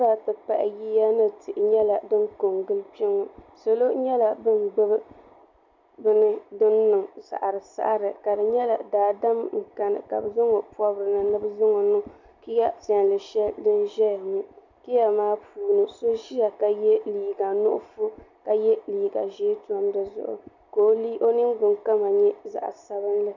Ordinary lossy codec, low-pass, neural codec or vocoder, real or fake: MP3, 64 kbps; 7.2 kHz; none; real